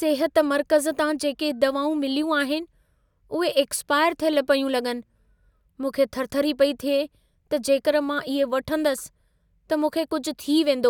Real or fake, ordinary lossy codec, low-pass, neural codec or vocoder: real; none; 19.8 kHz; none